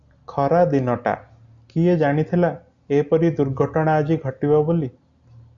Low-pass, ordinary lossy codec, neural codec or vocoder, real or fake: 7.2 kHz; Opus, 64 kbps; none; real